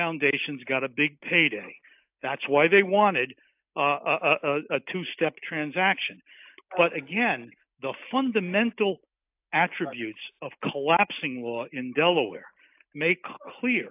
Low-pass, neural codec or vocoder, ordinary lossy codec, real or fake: 3.6 kHz; none; AAC, 32 kbps; real